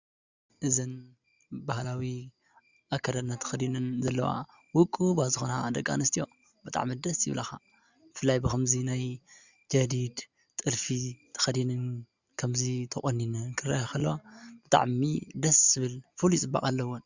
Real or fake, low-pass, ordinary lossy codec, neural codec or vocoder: real; 7.2 kHz; Opus, 64 kbps; none